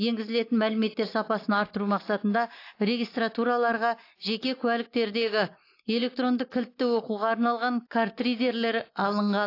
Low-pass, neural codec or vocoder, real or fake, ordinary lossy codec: 5.4 kHz; none; real; AAC, 32 kbps